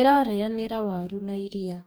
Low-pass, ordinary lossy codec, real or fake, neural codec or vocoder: none; none; fake; codec, 44.1 kHz, 2.6 kbps, DAC